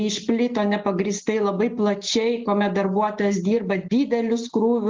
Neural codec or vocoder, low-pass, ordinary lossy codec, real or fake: none; 7.2 kHz; Opus, 16 kbps; real